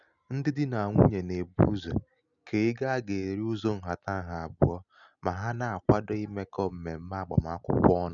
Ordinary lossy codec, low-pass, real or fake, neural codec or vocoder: none; 7.2 kHz; real; none